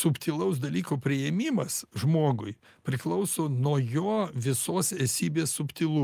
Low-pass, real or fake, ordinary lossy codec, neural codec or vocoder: 14.4 kHz; real; Opus, 32 kbps; none